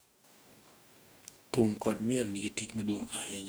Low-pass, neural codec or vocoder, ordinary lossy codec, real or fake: none; codec, 44.1 kHz, 2.6 kbps, DAC; none; fake